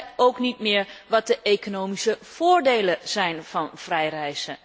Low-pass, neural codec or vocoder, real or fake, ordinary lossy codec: none; none; real; none